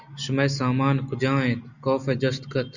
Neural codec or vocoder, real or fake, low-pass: none; real; 7.2 kHz